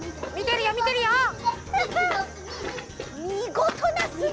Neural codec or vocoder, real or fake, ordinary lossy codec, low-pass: none; real; none; none